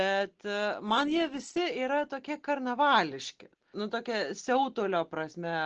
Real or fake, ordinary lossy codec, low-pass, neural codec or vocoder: real; Opus, 16 kbps; 7.2 kHz; none